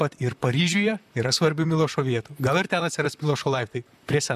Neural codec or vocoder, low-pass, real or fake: vocoder, 44.1 kHz, 128 mel bands, Pupu-Vocoder; 14.4 kHz; fake